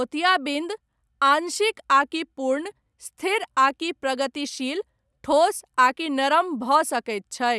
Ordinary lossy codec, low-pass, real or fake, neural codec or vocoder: none; none; real; none